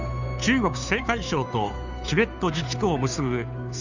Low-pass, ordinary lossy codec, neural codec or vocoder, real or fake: 7.2 kHz; none; codec, 16 kHz, 2 kbps, FunCodec, trained on Chinese and English, 25 frames a second; fake